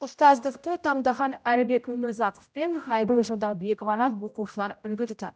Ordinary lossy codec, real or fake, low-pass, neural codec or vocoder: none; fake; none; codec, 16 kHz, 0.5 kbps, X-Codec, HuBERT features, trained on general audio